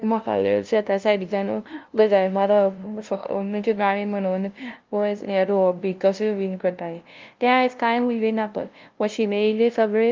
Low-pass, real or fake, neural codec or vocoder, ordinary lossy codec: 7.2 kHz; fake; codec, 16 kHz, 0.5 kbps, FunCodec, trained on LibriTTS, 25 frames a second; Opus, 24 kbps